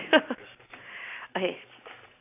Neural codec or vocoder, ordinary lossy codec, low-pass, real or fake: none; AAC, 24 kbps; 3.6 kHz; real